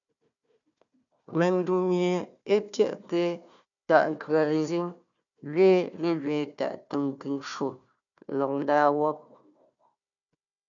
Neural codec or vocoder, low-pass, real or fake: codec, 16 kHz, 1 kbps, FunCodec, trained on Chinese and English, 50 frames a second; 7.2 kHz; fake